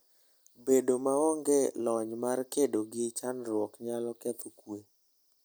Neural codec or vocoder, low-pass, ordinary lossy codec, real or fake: none; none; none; real